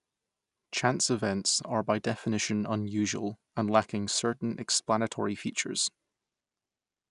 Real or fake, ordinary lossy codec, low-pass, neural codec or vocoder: real; none; 10.8 kHz; none